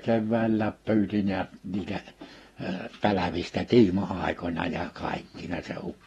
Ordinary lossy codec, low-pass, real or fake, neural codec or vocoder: AAC, 32 kbps; 19.8 kHz; fake; vocoder, 48 kHz, 128 mel bands, Vocos